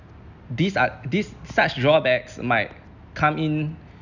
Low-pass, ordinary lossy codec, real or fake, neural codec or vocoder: 7.2 kHz; none; real; none